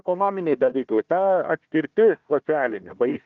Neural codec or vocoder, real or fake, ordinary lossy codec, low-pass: codec, 16 kHz, 1 kbps, FunCodec, trained on Chinese and English, 50 frames a second; fake; Opus, 24 kbps; 7.2 kHz